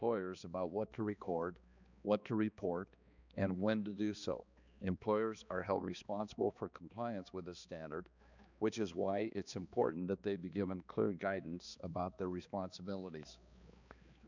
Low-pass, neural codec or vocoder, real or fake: 7.2 kHz; codec, 16 kHz, 2 kbps, X-Codec, HuBERT features, trained on balanced general audio; fake